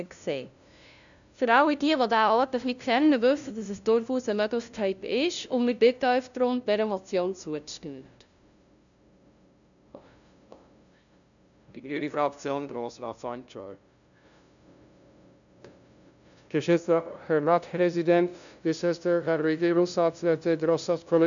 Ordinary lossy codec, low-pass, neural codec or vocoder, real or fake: none; 7.2 kHz; codec, 16 kHz, 0.5 kbps, FunCodec, trained on LibriTTS, 25 frames a second; fake